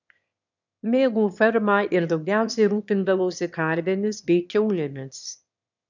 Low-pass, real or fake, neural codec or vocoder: 7.2 kHz; fake; autoencoder, 22.05 kHz, a latent of 192 numbers a frame, VITS, trained on one speaker